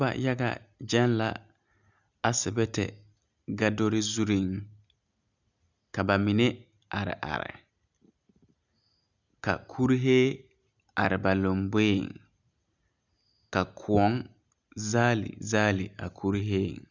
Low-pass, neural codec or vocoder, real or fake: 7.2 kHz; none; real